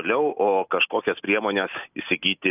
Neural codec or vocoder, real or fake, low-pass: none; real; 3.6 kHz